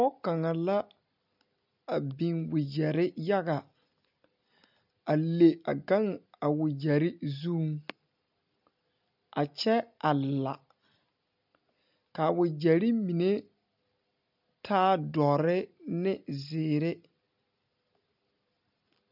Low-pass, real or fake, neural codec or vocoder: 5.4 kHz; real; none